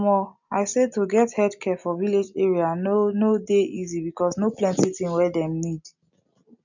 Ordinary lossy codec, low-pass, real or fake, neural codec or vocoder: none; 7.2 kHz; real; none